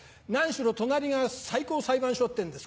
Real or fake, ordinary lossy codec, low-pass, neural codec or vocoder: real; none; none; none